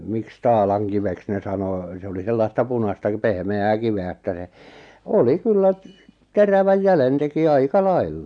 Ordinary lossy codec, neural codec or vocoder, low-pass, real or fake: none; none; 9.9 kHz; real